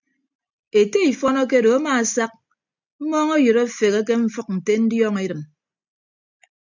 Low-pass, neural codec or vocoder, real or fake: 7.2 kHz; none; real